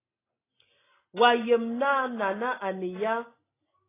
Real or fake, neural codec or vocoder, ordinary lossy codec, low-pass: real; none; AAC, 16 kbps; 3.6 kHz